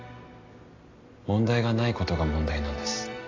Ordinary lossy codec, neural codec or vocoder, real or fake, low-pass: AAC, 32 kbps; none; real; 7.2 kHz